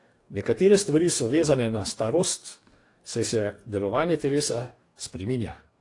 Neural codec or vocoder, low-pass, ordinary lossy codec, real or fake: codec, 44.1 kHz, 2.6 kbps, DAC; 10.8 kHz; AAC, 48 kbps; fake